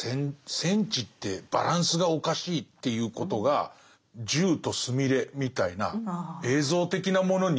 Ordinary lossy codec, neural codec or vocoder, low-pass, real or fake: none; none; none; real